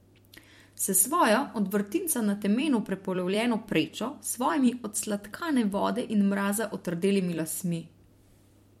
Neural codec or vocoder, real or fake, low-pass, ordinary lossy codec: vocoder, 44.1 kHz, 128 mel bands every 256 samples, BigVGAN v2; fake; 19.8 kHz; MP3, 64 kbps